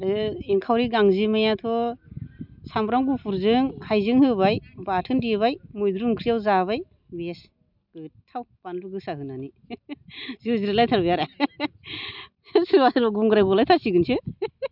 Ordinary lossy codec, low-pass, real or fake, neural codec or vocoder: none; 5.4 kHz; real; none